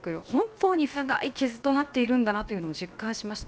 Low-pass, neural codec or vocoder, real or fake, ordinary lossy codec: none; codec, 16 kHz, about 1 kbps, DyCAST, with the encoder's durations; fake; none